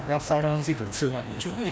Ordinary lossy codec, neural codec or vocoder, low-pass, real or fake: none; codec, 16 kHz, 1 kbps, FreqCodec, larger model; none; fake